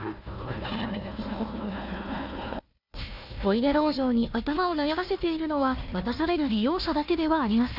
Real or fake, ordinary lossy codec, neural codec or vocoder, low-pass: fake; none; codec, 16 kHz, 1 kbps, FunCodec, trained on Chinese and English, 50 frames a second; 5.4 kHz